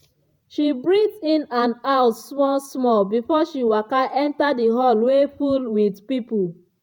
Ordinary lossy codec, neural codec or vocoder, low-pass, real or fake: MP3, 96 kbps; vocoder, 48 kHz, 128 mel bands, Vocos; 19.8 kHz; fake